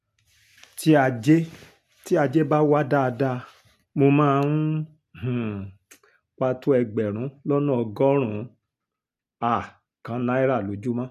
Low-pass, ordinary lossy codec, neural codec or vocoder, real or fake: 14.4 kHz; none; none; real